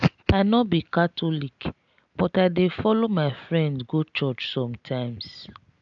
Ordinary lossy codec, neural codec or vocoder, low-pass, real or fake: none; none; 7.2 kHz; real